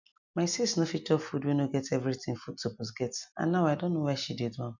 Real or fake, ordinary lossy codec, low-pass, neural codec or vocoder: real; none; 7.2 kHz; none